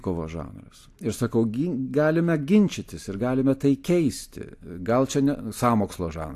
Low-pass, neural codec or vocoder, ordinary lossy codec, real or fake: 14.4 kHz; none; AAC, 64 kbps; real